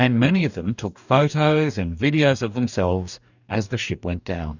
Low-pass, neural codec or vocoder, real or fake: 7.2 kHz; codec, 44.1 kHz, 2.6 kbps, DAC; fake